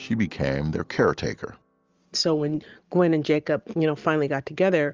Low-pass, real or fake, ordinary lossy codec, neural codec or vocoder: 7.2 kHz; real; Opus, 32 kbps; none